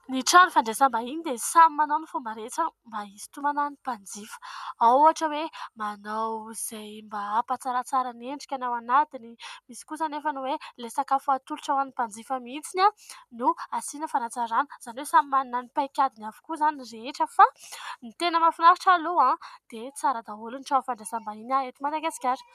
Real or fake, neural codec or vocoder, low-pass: real; none; 14.4 kHz